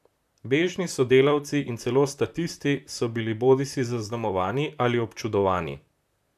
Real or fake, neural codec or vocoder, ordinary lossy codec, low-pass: fake; vocoder, 44.1 kHz, 128 mel bands, Pupu-Vocoder; none; 14.4 kHz